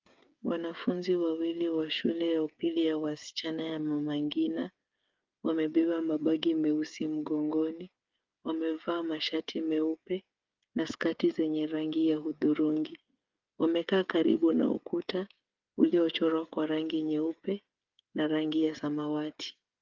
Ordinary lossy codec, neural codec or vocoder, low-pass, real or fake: Opus, 24 kbps; codec, 16 kHz, 8 kbps, FreqCodec, smaller model; 7.2 kHz; fake